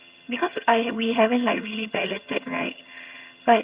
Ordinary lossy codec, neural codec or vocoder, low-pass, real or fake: Opus, 64 kbps; vocoder, 22.05 kHz, 80 mel bands, HiFi-GAN; 3.6 kHz; fake